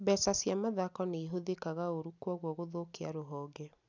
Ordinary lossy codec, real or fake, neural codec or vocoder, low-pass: none; real; none; 7.2 kHz